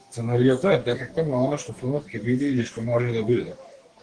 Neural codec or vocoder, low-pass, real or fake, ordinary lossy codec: codec, 44.1 kHz, 2.6 kbps, DAC; 9.9 kHz; fake; Opus, 16 kbps